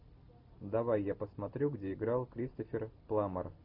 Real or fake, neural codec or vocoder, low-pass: real; none; 5.4 kHz